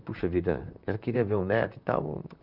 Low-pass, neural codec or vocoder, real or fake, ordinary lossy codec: 5.4 kHz; vocoder, 44.1 kHz, 128 mel bands, Pupu-Vocoder; fake; none